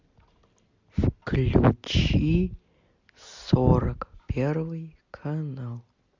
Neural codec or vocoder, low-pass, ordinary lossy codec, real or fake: none; 7.2 kHz; MP3, 64 kbps; real